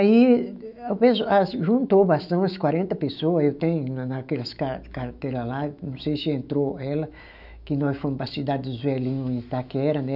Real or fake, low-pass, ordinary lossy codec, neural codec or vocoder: fake; 5.4 kHz; none; autoencoder, 48 kHz, 128 numbers a frame, DAC-VAE, trained on Japanese speech